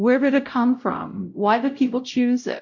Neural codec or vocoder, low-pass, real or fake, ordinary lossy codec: codec, 16 kHz, 0.5 kbps, X-Codec, WavLM features, trained on Multilingual LibriSpeech; 7.2 kHz; fake; MP3, 48 kbps